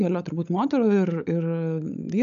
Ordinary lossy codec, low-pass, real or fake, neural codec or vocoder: AAC, 96 kbps; 7.2 kHz; fake; codec, 16 kHz, 16 kbps, FunCodec, trained on LibriTTS, 50 frames a second